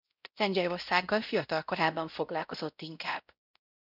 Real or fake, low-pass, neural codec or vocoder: fake; 5.4 kHz; codec, 16 kHz, 0.5 kbps, X-Codec, WavLM features, trained on Multilingual LibriSpeech